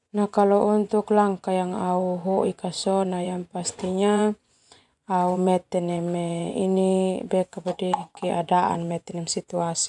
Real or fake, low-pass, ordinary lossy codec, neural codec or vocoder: fake; 10.8 kHz; none; vocoder, 24 kHz, 100 mel bands, Vocos